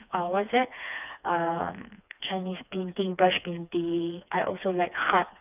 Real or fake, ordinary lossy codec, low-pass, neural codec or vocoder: fake; none; 3.6 kHz; codec, 16 kHz, 2 kbps, FreqCodec, smaller model